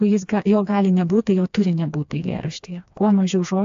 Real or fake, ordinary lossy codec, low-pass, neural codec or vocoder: fake; AAC, 64 kbps; 7.2 kHz; codec, 16 kHz, 2 kbps, FreqCodec, smaller model